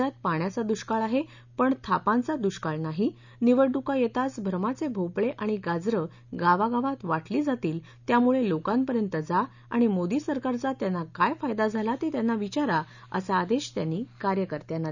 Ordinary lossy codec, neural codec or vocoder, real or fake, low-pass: none; none; real; 7.2 kHz